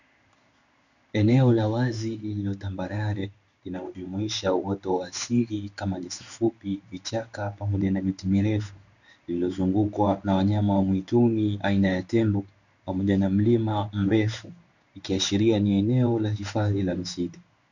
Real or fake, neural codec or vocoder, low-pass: fake; codec, 16 kHz in and 24 kHz out, 1 kbps, XY-Tokenizer; 7.2 kHz